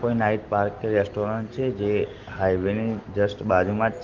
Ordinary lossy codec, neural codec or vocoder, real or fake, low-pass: Opus, 16 kbps; none; real; 7.2 kHz